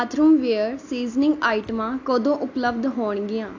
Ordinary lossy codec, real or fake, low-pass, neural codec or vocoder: AAC, 48 kbps; real; 7.2 kHz; none